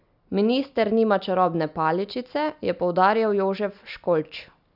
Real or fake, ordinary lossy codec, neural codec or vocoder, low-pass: real; none; none; 5.4 kHz